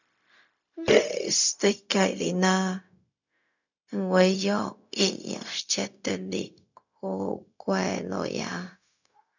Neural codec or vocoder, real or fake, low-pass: codec, 16 kHz, 0.4 kbps, LongCat-Audio-Codec; fake; 7.2 kHz